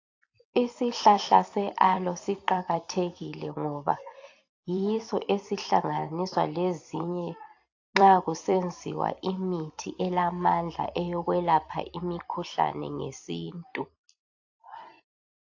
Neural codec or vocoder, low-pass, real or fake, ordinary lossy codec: vocoder, 44.1 kHz, 128 mel bands every 256 samples, BigVGAN v2; 7.2 kHz; fake; AAC, 48 kbps